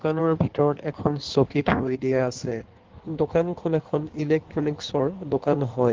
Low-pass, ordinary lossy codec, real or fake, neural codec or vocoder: 7.2 kHz; Opus, 16 kbps; fake; codec, 16 kHz in and 24 kHz out, 1.1 kbps, FireRedTTS-2 codec